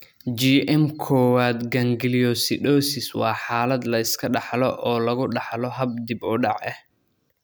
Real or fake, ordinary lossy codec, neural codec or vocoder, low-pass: real; none; none; none